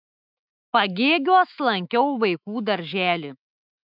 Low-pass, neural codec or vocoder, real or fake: 5.4 kHz; autoencoder, 48 kHz, 128 numbers a frame, DAC-VAE, trained on Japanese speech; fake